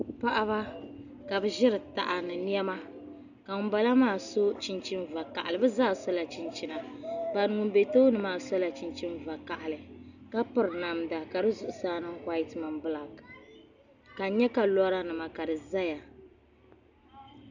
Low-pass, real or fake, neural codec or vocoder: 7.2 kHz; real; none